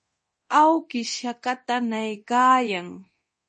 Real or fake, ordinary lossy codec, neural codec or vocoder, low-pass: fake; MP3, 32 kbps; codec, 24 kHz, 0.9 kbps, DualCodec; 10.8 kHz